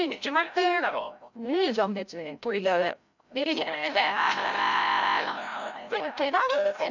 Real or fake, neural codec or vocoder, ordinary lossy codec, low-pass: fake; codec, 16 kHz, 0.5 kbps, FreqCodec, larger model; none; 7.2 kHz